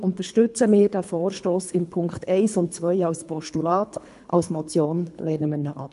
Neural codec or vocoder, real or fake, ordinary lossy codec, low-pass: codec, 24 kHz, 3 kbps, HILCodec; fake; AAC, 96 kbps; 10.8 kHz